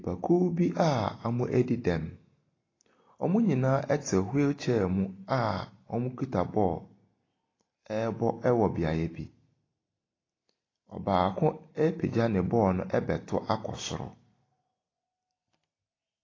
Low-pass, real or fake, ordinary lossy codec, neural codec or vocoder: 7.2 kHz; real; AAC, 32 kbps; none